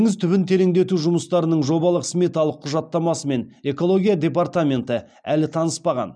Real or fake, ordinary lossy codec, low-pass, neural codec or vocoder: real; none; 9.9 kHz; none